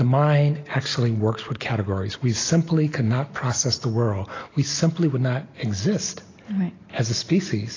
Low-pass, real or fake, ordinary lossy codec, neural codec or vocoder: 7.2 kHz; real; AAC, 32 kbps; none